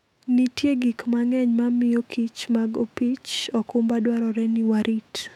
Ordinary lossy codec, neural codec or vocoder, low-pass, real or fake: none; autoencoder, 48 kHz, 128 numbers a frame, DAC-VAE, trained on Japanese speech; 19.8 kHz; fake